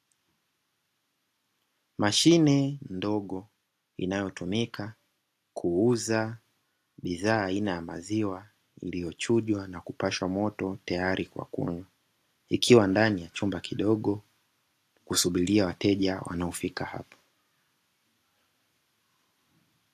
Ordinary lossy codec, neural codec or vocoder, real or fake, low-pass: AAC, 64 kbps; none; real; 14.4 kHz